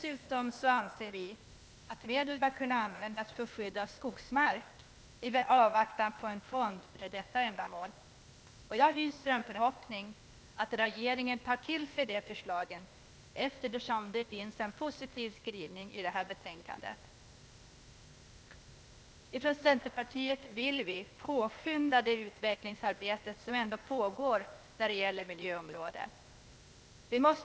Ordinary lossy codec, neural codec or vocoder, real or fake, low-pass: none; codec, 16 kHz, 0.8 kbps, ZipCodec; fake; none